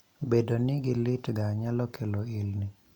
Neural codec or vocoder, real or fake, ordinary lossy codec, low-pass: none; real; none; 19.8 kHz